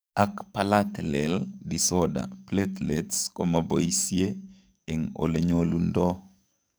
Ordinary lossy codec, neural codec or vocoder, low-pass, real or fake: none; codec, 44.1 kHz, 7.8 kbps, DAC; none; fake